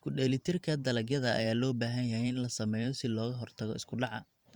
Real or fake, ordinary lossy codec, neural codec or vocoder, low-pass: fake; Opus, 64 kbps; vocoder, 44.1 kHz, 128 mel bands every 512 samples, BigVGAN v2; 19.8 kHz